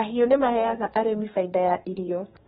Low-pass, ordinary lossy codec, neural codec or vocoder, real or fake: 14.4 kHz; AAC, 16 kbps; codec, 32 kHz, 1.9 kbps, SNAC; fake